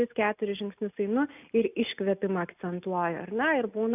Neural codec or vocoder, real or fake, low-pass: none; real; 3.6 kHz